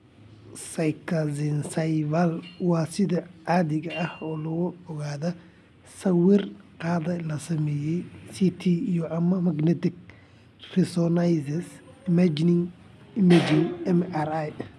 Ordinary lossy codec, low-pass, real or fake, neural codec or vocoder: none; none; real; none